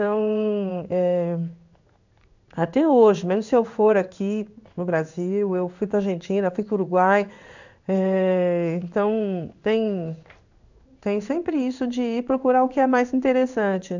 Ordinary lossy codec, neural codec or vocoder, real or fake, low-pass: none; codec, 16 kHz in and 24 kHz out, 1 kbps, XY-Tokenizer; fake; 7.2 kHz